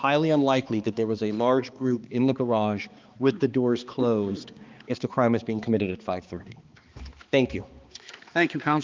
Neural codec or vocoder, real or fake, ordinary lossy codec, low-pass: codec, 16 kHz, 2 kbps, X-Codec, HuBERT features, trained on balanced general audio; fake; Opus, 24 kbps; 7.2 kHz